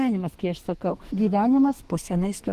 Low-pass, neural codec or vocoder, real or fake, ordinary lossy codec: 14.4 kHz; codec, 44.1 kHz, 2.6 kbps, SNAC; fake; Opus, 16 kbps